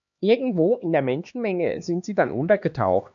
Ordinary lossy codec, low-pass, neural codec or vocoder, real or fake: AAC, 64 kbps; 7.2 kHz; codec, 16 kHz, 2 kbps, X-Codec, HuBERT features, trained on LibriSpeech; fake